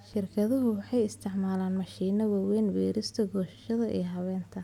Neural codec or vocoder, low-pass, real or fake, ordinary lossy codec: none; 19.8 kHz; real; none